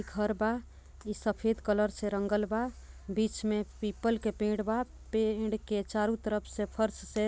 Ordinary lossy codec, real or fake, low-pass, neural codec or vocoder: none; real; none; none